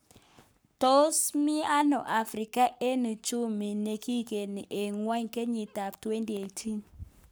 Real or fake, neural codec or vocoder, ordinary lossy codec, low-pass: fake; codec, 44.1 kHz, 7.8 kbps, Pupu-Codec; none; none